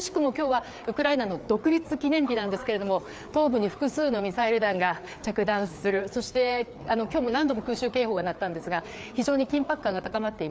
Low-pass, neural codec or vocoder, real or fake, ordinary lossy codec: none; codec, 16 kHz, 4 kbps, FreqCodec, larger model; fake; none